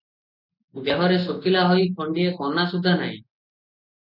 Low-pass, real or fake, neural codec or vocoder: 5.4 kHz; real; none